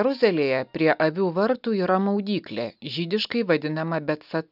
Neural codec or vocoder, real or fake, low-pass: none; real; 5.4 kHz